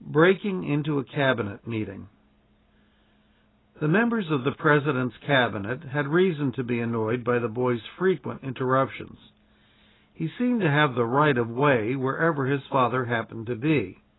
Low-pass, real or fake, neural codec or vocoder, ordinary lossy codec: 7.2 kHz; real; none; AAC, 16 kbps